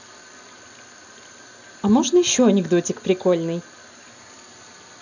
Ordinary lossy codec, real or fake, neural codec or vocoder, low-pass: none; real; none; 7.2 kHz